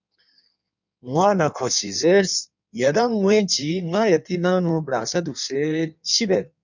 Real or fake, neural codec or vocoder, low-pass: fake; codec, 16 kHz in and 24 kHz out, 1.1 kbps, FireRedTTS-2 codec; 7.2 kHz